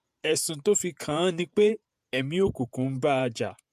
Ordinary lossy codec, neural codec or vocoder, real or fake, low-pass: AAC, 96 kbps; vocoder, 48 kHz, 128 mel bands, Vocos; fake; 14.4 kHz